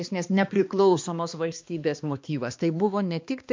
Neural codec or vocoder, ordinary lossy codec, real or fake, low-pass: codec, 16 kHz, 2 kbps, X-Codec, HuBERT features, trained on balanced general audio; MP3, 48 kbps; fake; 7.2 kHz